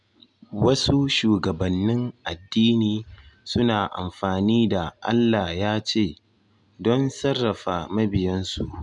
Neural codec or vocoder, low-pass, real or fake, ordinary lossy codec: none; 10.8 kHz; real; none